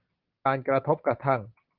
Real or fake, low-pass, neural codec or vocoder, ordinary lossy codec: real; 5.4 kHz; none; Opus, 24 kbps